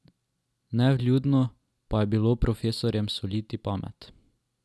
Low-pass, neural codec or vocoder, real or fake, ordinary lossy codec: none; none; real; none